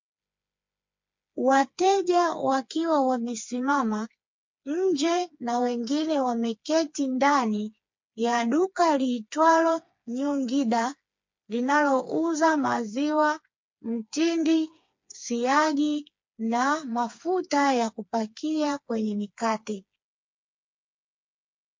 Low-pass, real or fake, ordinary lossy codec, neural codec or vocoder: 7.2 kHz; fake; MP3, 48 kbps; codec, 16 kHz, 4 kbps, FreqCodec, smaller model